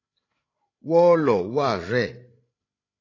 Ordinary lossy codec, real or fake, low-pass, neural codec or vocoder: AAC, 32 kbps; fake; 7.2 kHz; codec, 16 kHz, 16 kbps, FreqCodec, larger model